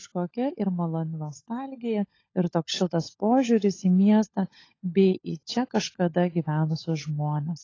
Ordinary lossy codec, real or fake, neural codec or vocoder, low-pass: AAC, 32 kbps; fake; codec, 16 kHz, 16 kbps, FunCodec, trained on LibriTTS, 50 frames a second; 7.2 kHz